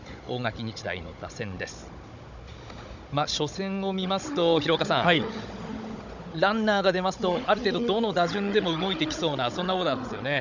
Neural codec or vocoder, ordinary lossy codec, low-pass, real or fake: codec, 16 kHz, 16 kbps, FunCodec, trained on Chinese and English, 50 frames a second; none; 7.2 kHz; fake